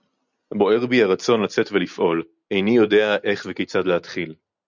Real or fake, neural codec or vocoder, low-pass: real; none; 7.2 kHz